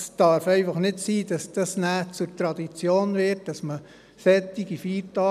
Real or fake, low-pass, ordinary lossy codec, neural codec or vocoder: real; 14.4 kHz; none; none